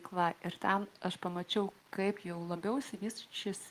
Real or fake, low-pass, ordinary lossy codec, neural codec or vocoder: real; 14.4 kHz; Opus, 32 kbps; none